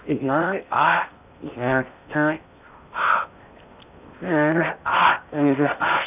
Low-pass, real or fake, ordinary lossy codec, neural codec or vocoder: 3.6 kHz; fake; none; codec, 16 kHz in and 24 kHz out, 0.6 kbps, FocalCodec, streaming, 4096 codes